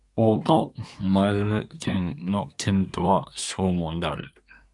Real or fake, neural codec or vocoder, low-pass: fake; codec, 24 kHz, 1 kbps, SNAC; 10.8 kHz